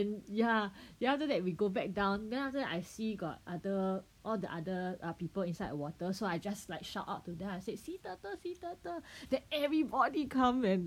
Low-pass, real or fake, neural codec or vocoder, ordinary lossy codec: 19.8 kHz; real; none; none